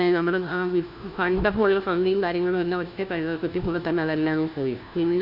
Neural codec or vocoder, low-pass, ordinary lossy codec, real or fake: codec, 16 kHz, 1 kbps, FunCodec, trained on LibriTTS, 50 frames a second; 5.4 kHz; none; fake